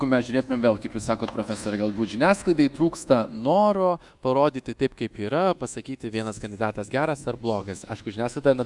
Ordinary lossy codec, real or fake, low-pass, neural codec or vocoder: Opus, 64 kbps; fake; 10.8 kHz; codec, 24 kHz, 1.2 kbps, DualCodec